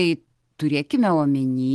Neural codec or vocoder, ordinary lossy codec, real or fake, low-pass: none; Opus, 16 kbps; real; 10.8 kHz